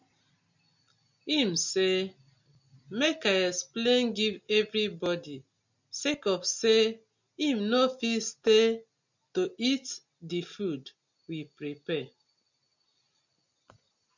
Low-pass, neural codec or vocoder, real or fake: 7.2 kHz; none; real